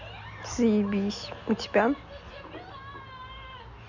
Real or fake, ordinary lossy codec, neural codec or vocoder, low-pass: real; none; none; 7.2 kHz